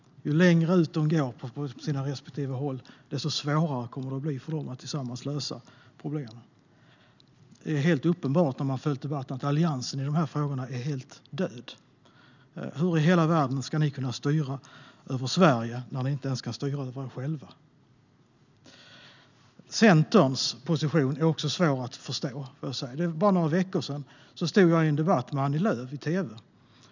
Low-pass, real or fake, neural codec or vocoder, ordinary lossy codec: 7.2 kHz; real; none; none